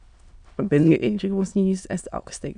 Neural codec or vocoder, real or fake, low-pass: autoencoder, 22.05 kHz, a latent of 192 numbers a frame, VITS, trained on many speakers; fake; 9.9 kHz